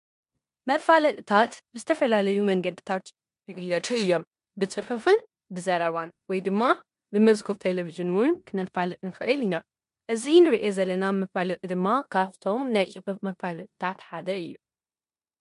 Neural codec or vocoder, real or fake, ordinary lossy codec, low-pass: codec, 16 kHz in and 24 kHz out, 0.9 kbps, LongCat-Audio-Codec, four codebook decoder; fake; MP3, 64 kbps; 10.8 kHz